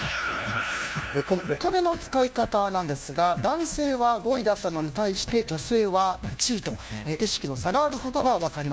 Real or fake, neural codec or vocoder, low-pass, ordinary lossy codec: fake; codec, 16 kHz, 1 kbps, FunCodec, trained on LibriTTS, 50 frames a second; none; none